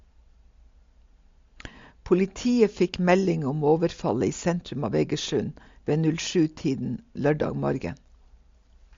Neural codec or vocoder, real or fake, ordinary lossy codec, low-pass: none; real; MP3, 48 kbps; 7.2 kHz